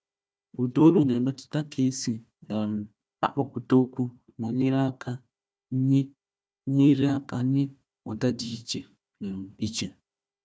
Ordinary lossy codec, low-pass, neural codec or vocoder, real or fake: none; none; codec, 16 kHz, 1 kbps, FunCodec, trained on Chinese and English, 50 frames a second; fake